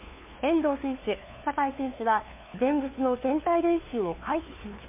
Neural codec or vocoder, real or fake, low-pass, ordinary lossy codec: codec, 16 kHz, 2 kbps, FunCodec, trained on LibriTTS, 25 frames a second; fake; 3.6 kHz; MP3, 32 kbps